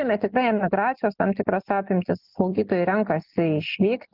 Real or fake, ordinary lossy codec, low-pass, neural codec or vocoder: real; Opus, 24 kbps; 5.4 kHz; none